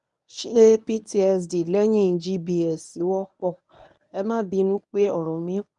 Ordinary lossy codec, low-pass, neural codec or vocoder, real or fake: none; 10.8 kHz; codec, 24 kHz, 0.9 kbps, WavTokenizer, medium speech release version 1; fake